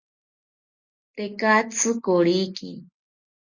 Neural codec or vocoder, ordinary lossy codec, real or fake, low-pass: none; Opus, 64 kbps; real; 7.2 kHz